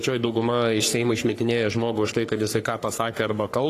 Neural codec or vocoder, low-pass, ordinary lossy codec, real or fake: codec, 44.1 kHz, 3.4 kbps, Pupu-Codec; 14.4 kHz; AAC, 48 kbps; fake